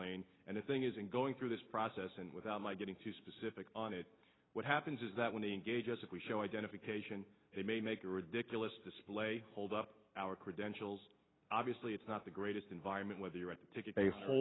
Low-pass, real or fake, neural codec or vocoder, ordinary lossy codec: 7.2 kHz; real; none; AAC, 16 kbps